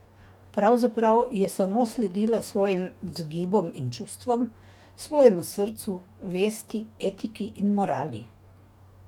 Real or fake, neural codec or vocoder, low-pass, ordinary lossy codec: fake; codec, 44.1 kHz, 2.6 kbps, DAC; 19.8 kHz; none